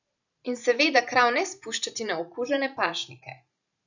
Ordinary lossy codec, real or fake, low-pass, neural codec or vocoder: none; fake; 7.2 kHz; vocoder, 44.1 kHz, 128 mel bands every 512 samples, BigVGAN v2